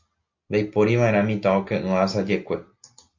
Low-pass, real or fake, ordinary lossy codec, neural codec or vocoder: 7.2 kHz; real; AAC, 48 kbps; none